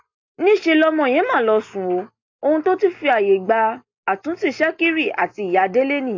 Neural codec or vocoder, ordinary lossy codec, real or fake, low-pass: none; AAC, 48 kbps; real; 7.2 kHz